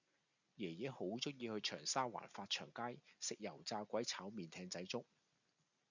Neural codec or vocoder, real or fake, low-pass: none; real; 7.2 kHz